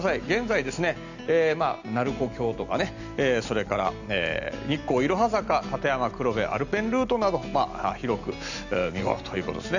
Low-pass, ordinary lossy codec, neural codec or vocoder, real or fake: 7.2 kHz; none; none; real